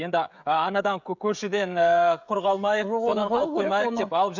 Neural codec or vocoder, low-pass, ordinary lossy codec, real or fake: codec, 16 kHz, 16 kbps, FreqCodec, smaller model; 7.2 kHz; none; fake